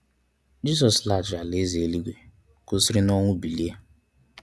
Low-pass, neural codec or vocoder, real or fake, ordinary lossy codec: none; none; real; none